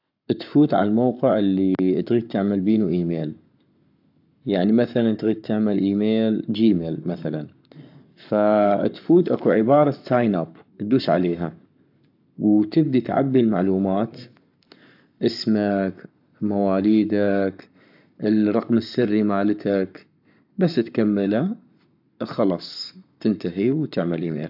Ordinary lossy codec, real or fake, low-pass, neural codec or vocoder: AAC, 48 kbps; fake; 5.4 kHz; codec, 44.1 kHz, 7.8 kbps, Pupu-Codec